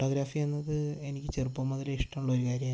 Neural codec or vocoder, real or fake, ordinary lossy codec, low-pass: none; real; none; none